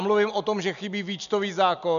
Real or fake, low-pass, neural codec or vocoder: real; 7.2 kHz; none